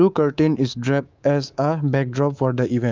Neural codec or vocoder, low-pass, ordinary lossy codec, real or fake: codec, 24 kHz, 3.1 kbps, DualCodec; 7.2 kHz; Opus, 24 kbps; fake